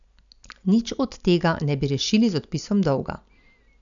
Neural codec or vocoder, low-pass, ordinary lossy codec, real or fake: none; 7.2 kHz; none; real